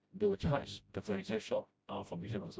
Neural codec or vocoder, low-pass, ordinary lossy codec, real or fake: codec, 16 kHz, 0.5 kbps, FreqCodec, smaller model; none; none; fake